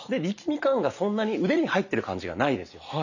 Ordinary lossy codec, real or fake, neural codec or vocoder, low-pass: none; real; none; 7.2 kHz